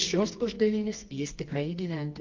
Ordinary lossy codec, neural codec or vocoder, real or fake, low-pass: Opus, 32 kbps; codec, 24 kHz, 0.9 kbps, WavTokenizer, medium music audio release; fake; 7.2 kHz